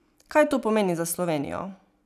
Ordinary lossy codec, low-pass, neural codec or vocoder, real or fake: none; 14.4 kHz; none; real